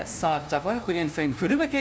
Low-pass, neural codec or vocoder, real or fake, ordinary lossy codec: none; codec, 16 kHz, 0.5 kbps, FunCodec, trained on LibriTTS, 25 frames a second; fake; none